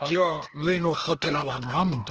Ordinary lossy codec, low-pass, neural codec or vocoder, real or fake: Opus, 16 kbps; 7.2 kHz; codec, 16 kHz, 0.8 kbps, ZipCodec; fake